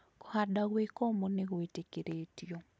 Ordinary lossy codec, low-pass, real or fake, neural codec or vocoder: none; none; real; none